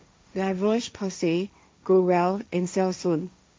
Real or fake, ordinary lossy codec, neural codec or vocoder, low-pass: fake; none; codec, 16 kHz, 1.1 kbps, Voila-Tokenizer; none